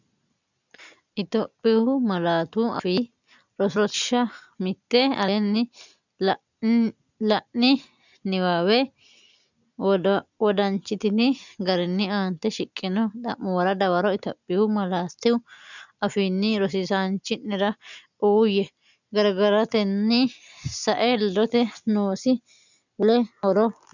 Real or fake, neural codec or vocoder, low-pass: real; none; 7.2 kHz